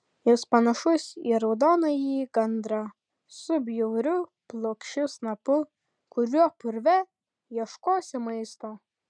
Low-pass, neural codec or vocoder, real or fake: 9.9 kHz; none; real